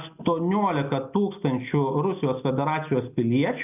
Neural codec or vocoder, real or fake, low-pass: none; real; 3.6 kHz